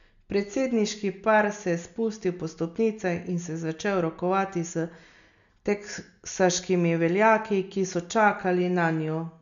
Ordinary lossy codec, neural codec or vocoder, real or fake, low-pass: none; none; real; 7.2 kHz